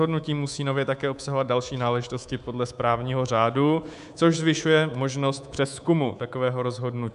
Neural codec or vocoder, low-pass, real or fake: codec, 24 kHz, 3.1 kbps, DualCodec; 10.8 kHz; fake